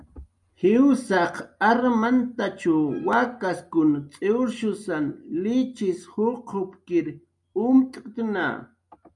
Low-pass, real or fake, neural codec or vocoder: 10.8 kHz; real; none